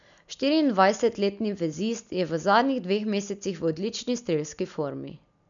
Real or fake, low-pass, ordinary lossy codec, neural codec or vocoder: real; 7.2 kHz; none; none